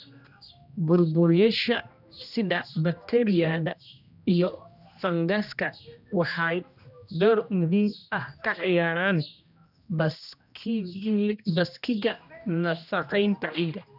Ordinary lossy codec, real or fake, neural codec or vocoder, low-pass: none; fake; codec, 16 kHz, 1 kbps, X-Codec, HuBERT features, trained on general audio; 5.4 kHz